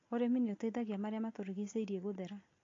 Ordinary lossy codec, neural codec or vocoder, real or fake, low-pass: AAC, 32 kbps; none; real; 7.2 kHz